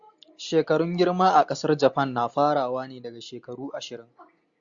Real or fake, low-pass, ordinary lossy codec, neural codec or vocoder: real; 7.2 kHz; Opus, 64 kbps; none